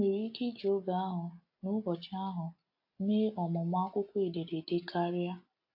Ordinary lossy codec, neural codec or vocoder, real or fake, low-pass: AAC, 32 kbps; none; real; 5.4 kHz